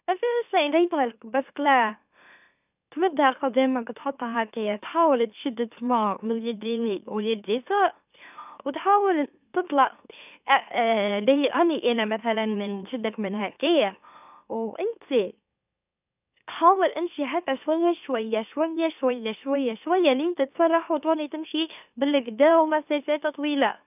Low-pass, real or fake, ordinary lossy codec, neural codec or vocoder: 3.6 kHz; fake; none; autoencoder, 44.1 kHz, a latent of 192 numbers a frame, MeloTTS